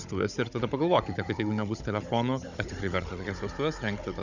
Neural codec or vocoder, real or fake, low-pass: codec, 16 kHz, 16 kbps, FunCodec, trained on Chinese and English, 50 frames a second; fake; 7.2 kHz